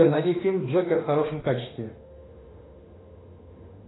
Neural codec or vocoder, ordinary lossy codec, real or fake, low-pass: autoencoder, 48 kHz, 32 numbers a frame, DAC-VAE, trained on Japanese speech; AAC, 16 kbps; fake; 7.2 kHz